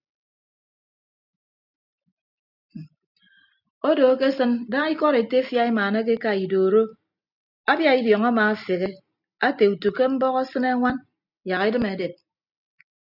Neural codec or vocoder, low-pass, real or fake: none; 5.4 kHz; real